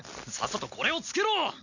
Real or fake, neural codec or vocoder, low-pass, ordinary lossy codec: real; none; 7.2 kHz; none